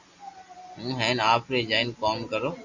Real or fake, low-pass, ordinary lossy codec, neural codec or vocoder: real; 7.2 kHz; Opus, 64 kbps; none